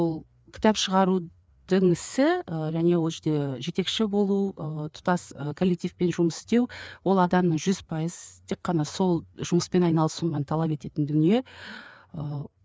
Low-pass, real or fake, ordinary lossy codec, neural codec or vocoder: none; fake; none; codec, 16 kHz, 4 kbps, FreqCodec, larger model